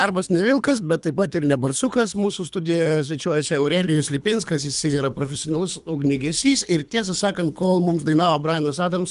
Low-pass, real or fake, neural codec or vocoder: 10.8 kHz; fake; codec, 24 kHz, 3 kbps, HILCodec